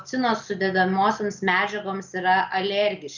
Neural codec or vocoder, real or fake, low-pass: none; real; 7.2 kHz